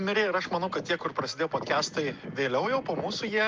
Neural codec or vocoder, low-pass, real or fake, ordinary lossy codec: none; 7.2 kHz; real; Opus, 24 kbps